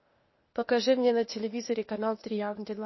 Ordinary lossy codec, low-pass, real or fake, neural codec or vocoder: MP3, 24 kbps; 7.2 kHz; fake; codec, 16 kHz, 0.8 kbps, ZipCodec